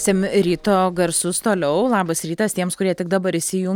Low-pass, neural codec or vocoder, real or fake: 19.8 kHz; none; real